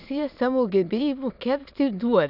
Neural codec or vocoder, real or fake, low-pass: autoencoder, 22.05 kHz, a latent of 192 numbers a frame, VITS, trained on many speakers; fake; 5.4 kHz